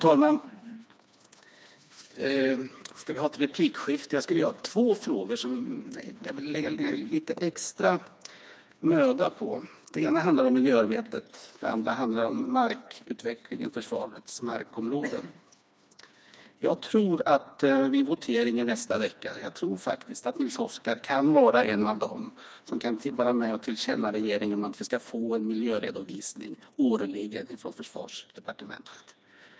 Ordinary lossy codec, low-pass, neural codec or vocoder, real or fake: none; none; codec, 16 kHz, 2 kbps, FreqCodec, smaller model; fake